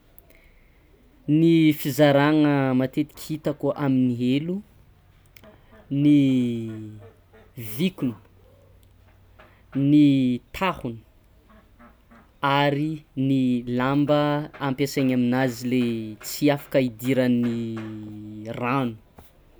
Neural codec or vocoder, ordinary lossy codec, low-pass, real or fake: none; none; none; real